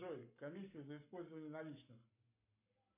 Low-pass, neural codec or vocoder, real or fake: 3.6 kHz; codec, 44.1 kHz, 7.8 kbps, Pupu-Codec; fake